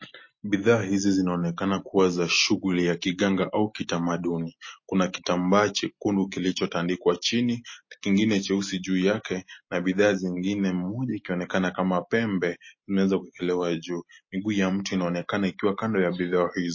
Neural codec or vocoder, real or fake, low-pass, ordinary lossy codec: none; real; 7.2 kHz; MP3, 32 kbps